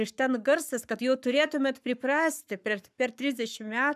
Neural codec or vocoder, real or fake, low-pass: codec, 44.1 kHz, 7.8 kbps, Pupu-Codec; fake; 14.4 kHz